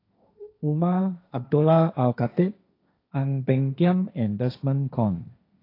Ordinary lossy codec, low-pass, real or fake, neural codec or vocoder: AAC, 32 kbps; 5.4 kHz; fake; codec, 16 kHz, 1.1 kbps, Voila-Tokenizer